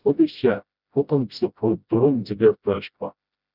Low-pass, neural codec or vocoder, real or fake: 5.4 kHz; codec, 16 kHz, 0.5 kbps, FreqCodec, smaller model; fake